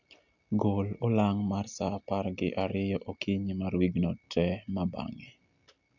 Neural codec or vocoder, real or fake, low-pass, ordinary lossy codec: none; real; 7.2 kHz; none